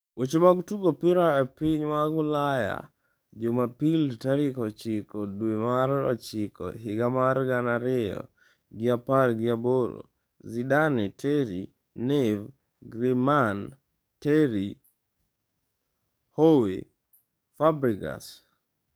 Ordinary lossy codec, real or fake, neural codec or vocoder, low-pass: none; fake; codec, 44.1 kHz, 7.8 kbps, DAC; none